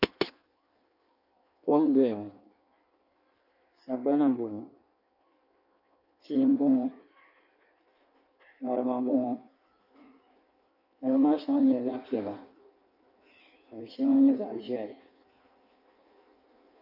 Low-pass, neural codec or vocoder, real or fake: 5.4 kHz; codec, 16 kHz in and 24 kHz out, 1.1 kbps, FireRedTTS-2 codec; fake